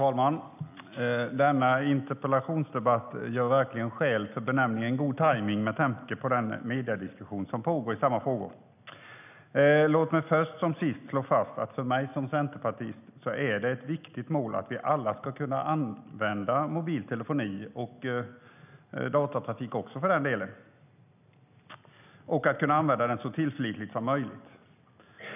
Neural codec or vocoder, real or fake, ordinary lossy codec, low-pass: none; real; none; 3.6 kHz